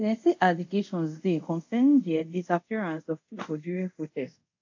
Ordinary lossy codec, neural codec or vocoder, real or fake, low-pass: AAC, 48 kbps; codec, 24 kHz, 0.5 kbps, DualCodec; fake; 7.2 kHz